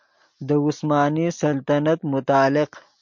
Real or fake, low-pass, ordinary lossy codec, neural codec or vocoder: real; 7.2 kHz; MP3, 48 kbps; none